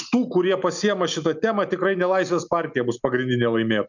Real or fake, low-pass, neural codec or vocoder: real; 7.2 kHz; none